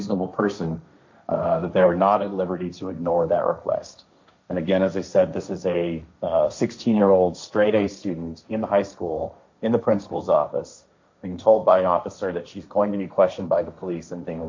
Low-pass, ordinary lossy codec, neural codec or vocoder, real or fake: 7.2 kHz; MP3, 64 kbps; codec, 16 kHz, 1.1 kbps, Voila-Tokenizer; fake